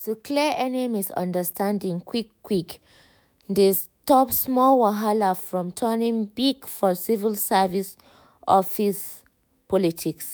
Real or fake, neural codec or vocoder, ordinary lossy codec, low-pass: fake; autoencoder, 48 kHz, 128 numbers a frame, DAC-VAE, trained on Japanese speech; none; none